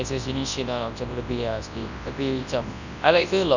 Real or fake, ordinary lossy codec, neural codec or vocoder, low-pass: fake; none; codec, 24 kHz, 0.9 kbps, WavTokenizer, large speech release; 7.2 kHz